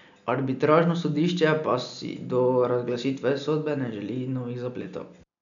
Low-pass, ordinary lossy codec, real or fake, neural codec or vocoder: 7.2 kHz; none; real; none